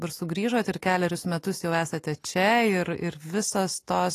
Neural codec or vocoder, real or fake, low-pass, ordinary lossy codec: none; real; 14.4 kHz; AAC, 48 kbps